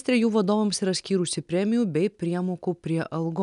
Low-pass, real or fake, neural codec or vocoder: 10.8 kHz; real; none